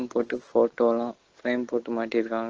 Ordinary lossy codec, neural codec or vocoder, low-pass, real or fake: Opus, 16 kbps; none; 7.2 kHz; real